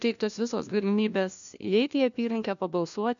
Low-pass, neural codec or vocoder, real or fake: 7.2 kHz; codec, 16 kHz, 1 kbps, FunCodec, trained on LibriTTS, 50 frames a second; fake